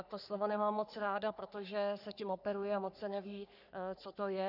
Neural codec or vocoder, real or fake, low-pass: codec, 44.1 kHz, 3.4 kbps, Pupu-Codec; fake; 5.4 kHz